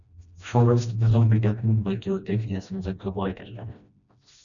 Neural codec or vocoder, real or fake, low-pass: codec, 16 kHz, 1 kbps, FreqCodec, smaller model; fake; 7.2 kHz